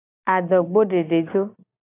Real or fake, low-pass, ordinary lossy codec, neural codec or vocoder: real; 3.6 kHz; AAC, 24 kbps; none